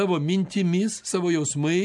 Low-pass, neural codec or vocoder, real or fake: 10.8 kHz; none; real